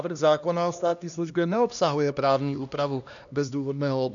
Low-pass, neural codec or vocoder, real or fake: 7.2 kHz; codec, 16 kHz, 1 kbps, X-Codec, HuBERT features, trained on LibriSpeech; fake